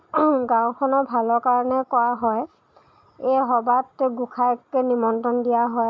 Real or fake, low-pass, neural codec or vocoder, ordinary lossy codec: real; none; none; none